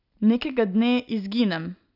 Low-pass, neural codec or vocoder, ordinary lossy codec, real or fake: 5.4 kHz; none; none; real